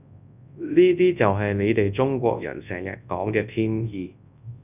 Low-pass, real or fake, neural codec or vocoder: 3.6 kHz; fake; codec, 24 kHz, 0.9 kbps, WavTokenizer, large speech release